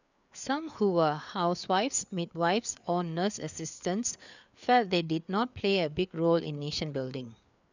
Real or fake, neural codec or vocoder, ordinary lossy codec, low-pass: fake; codec, 16 kHz, 4 kbps, FreqCodec, larger model; none; 7.2 kHz